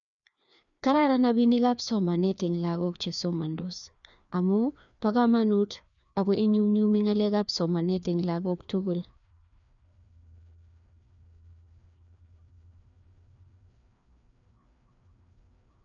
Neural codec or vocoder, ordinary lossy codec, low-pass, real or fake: codec, 16 kHz, 2 kbps, FreqCodec, larger model; none; 7.2 kHz; fake